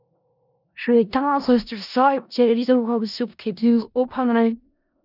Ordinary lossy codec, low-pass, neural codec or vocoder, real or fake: none; 5.4 kHz; codec, 16 kHz in and 24 kHz out, 0.4 kbps, LongCat-Audio-Codec, four codebook decoder; fake